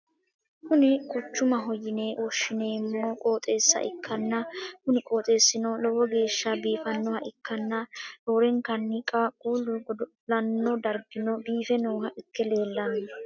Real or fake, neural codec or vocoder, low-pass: real; none; 7.2 kHz